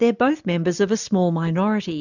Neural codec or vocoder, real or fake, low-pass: none; real; 7.2 kHz